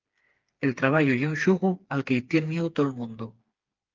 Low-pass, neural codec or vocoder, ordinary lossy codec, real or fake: 7.2 kHz; codec, 16 kHz, 4 kbps, FreqCodec, smaller model; Opus, 24 kbps; fake